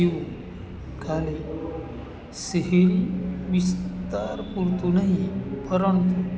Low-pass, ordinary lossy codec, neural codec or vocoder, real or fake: none; none; none; real